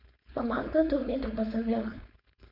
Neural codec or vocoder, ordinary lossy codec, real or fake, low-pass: codec, 16 kHz, 4.8 kbps, FACodec; none; fake; 5.4 kHz